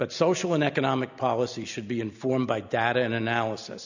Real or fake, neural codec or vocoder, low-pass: real; none; 7.2 kHz